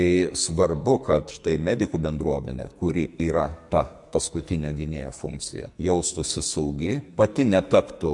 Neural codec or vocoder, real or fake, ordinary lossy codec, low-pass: codec, 44.1 kHz, 2.6 kbps, SNAC; fake; MP3, 64 kbps; 10.8 kHz